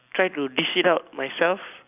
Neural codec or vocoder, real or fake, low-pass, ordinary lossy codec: none; real; 3.6 kHz; none